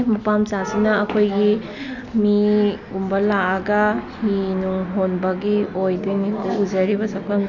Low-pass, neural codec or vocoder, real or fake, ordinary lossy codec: 7.2 kHz; none; real; none